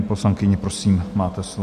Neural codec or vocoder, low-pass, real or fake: none; 14.4 kHz; real